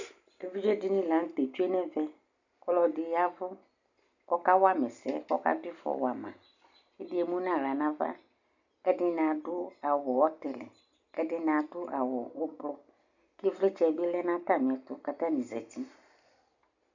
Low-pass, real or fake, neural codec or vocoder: 7.2 kHz; real; none